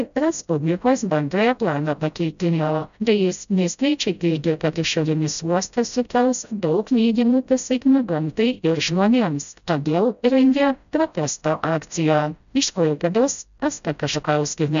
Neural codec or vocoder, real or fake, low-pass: codec, 16 kHz, 0.5 kbps, FreqCodec, smaller model; fake; 7.2 kHz